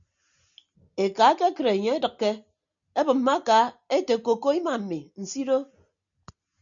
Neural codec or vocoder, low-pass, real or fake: none; 7.2 kHz; real